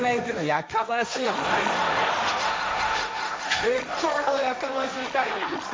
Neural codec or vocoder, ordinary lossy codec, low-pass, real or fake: codec, 16 kHz, 1.1 kbps, Voila-Tokenizer; none; none; fake